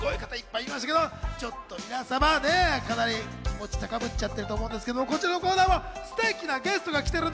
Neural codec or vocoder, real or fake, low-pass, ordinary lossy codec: none; real; none; none